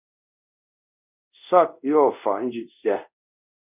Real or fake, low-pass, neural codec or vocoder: fake; 3.6 kHz; codec, 24 kHz, 0.5 kbps, DualCodec